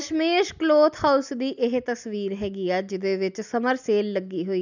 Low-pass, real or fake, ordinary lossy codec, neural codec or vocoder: 7.2 kHz; real; none; none